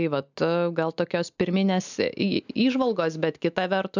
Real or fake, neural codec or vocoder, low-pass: real; none; 7.2 kHz